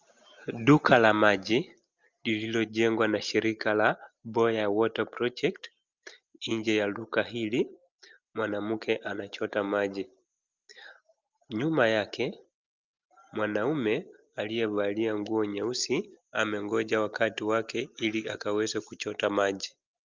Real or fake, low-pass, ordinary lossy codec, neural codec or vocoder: real; 7.2 kHz; Opus, 32 kbps; none